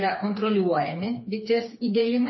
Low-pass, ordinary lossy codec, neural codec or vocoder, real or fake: 7.2 kHz; MP3, 24 kbps; codec, 16 kHz, 4 kbps, FreqCodec, smaller model; fake